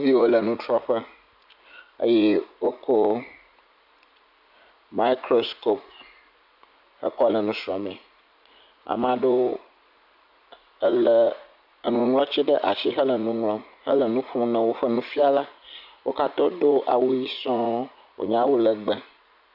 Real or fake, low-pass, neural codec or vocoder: fake; 5.4 kHz; vocoder, 44.1 kHz, 80 mel bands, Vocos